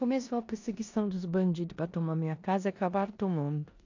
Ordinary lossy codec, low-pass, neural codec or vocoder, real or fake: AAC, 48 kbps; 7.2 kHz; codec, 16 kHz in and 24 kHz out, 0.9 kbps, LongCat-Audio-Codec, four codebook decoder; fake